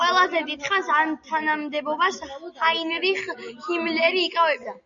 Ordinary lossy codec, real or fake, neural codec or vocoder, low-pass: Opus, 64 kbps; real; none; 7.2 kHz